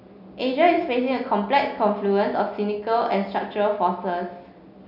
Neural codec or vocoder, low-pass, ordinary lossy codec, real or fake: none; 5.4 kHz; none; real